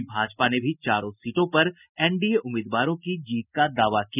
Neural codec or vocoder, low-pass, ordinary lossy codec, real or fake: none; 3.6 kHz; none; real